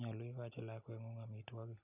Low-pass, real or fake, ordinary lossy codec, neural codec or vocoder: 3.6 kHz; real; none; none